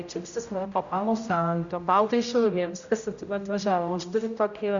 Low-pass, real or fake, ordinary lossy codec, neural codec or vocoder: 7.2 kHz; fake; Opus, 64 kbps; codec, 16 kHz, 0.5 kbps, X-Codec, HuBERT features, trained on general audio